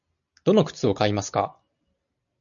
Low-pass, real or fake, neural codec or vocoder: 7.2 kHz; real; none